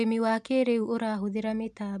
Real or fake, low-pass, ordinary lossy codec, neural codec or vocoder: real; none; none; none